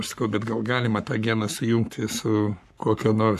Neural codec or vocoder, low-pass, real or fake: codec, 44.1 kHz, 7.8 kbps, Pupu-Codec; 14.4 kHz; fake